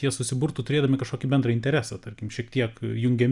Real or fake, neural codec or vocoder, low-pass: real; none; 10.8 kHz